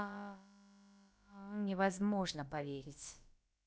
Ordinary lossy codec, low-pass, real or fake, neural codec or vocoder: none; none; fake; codec, 16 kHz, about 1 kbps, DyCAST, with the encoder's durations